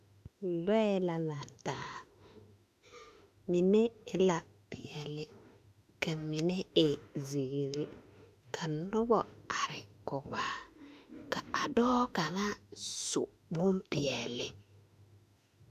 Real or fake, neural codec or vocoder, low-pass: fake; autoencoder, 48 kHz, 32 numbers a frame, DAC-VAE, trained on Japanese speech; 14.4 kHz